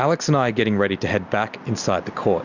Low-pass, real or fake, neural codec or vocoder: 7.2 kHz; real; none